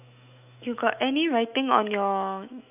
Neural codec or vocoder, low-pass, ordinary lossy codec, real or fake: autoencoder, 48 kHz, 128 numbers a frame, DAC-VAE, trained on Japanese speech; 3.6 kHz; none; fake